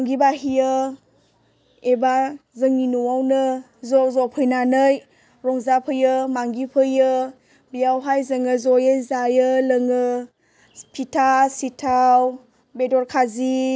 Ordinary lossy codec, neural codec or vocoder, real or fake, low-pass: none; none; real; none